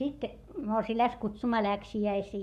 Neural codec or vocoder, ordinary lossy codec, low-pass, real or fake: codec, 44.1 kHz, 7.8 kbps, Pupu-Codec; none; 14.4 kHz; fake